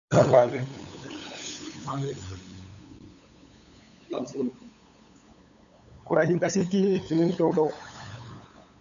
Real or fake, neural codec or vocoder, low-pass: fake; codec, 16 kHz, 8 kbps, FunCodec, trained on LibriTTS, 25 frames a second; 7.2 kHz